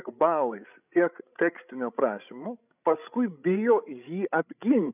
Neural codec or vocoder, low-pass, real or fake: codec, 16 kHz, 16 kbps, FreqCodec, larger model; 3.6 kHz; fake